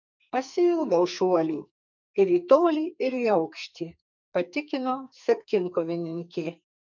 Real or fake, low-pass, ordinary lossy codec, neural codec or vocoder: fake; 7.2 kHz; MP3, 64 kbps; codec, 44.1 kHz, 2.6 kbps, SNAC